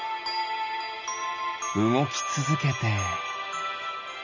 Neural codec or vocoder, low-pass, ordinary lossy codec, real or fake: none; 7.2 kHz; none; real